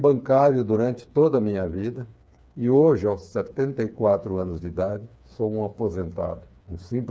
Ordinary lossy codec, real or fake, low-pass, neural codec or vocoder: none; fake; none; codec, 16 kHz, 4 kbps, FreqCodec, smaller model